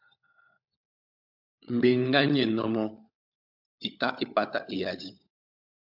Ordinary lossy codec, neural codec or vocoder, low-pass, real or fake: AAC, 48 kbps; codec, 16 kHz, 16 kbps, FunCodec, trained on LibriTTS, 50 frames a second; 5.4 kHz; fake